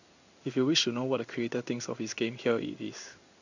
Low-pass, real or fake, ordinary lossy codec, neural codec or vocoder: 7.2 kHz; fake; none; codec, 16 kHz in and 24 kHz out, 1 kbps, XY-Tokenizer